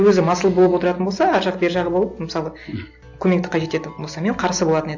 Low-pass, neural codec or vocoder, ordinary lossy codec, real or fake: 7.2 kHz; none; AAC, 48 kbps; real